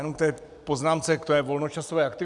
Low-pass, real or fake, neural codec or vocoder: 10.8 kHz; real; none